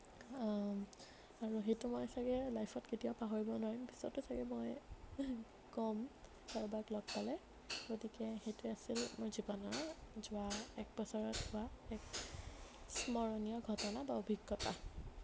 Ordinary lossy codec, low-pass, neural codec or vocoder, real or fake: none; none; none; real